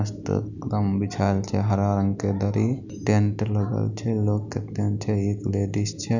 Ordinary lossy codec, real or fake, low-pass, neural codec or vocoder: none; real; 7.2 kHz; none